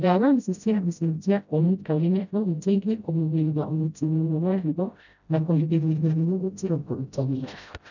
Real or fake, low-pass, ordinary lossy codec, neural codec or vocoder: fake; 7.2 kHz; none; codec, 16 kHz, 0.5 kbps, FreqCodec, smaller model